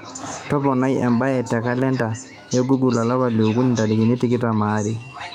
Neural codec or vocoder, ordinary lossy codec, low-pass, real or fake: autoencoder, 48 kHz, 128 numbers a frame, DAC-VAE, trained on Japanese speech; none; 19.8 kHz; fake